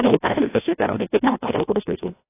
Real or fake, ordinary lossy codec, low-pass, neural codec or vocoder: fake; AAC, 24 kbps; 3.6 kHz; codec, 16 kHz, 1 kbps, FreqCodec, larger model